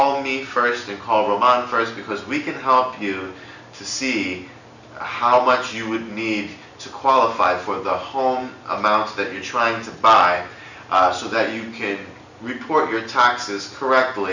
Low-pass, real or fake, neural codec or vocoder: 7.2 kHz; real; none